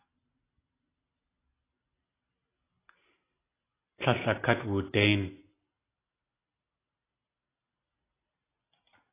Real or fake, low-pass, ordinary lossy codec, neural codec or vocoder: real; 3.6 kHz; AAC, 16 kbps; none